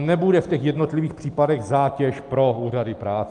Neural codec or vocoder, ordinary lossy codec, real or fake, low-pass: none; Opus, 32 kbps; real; 10.8 kHz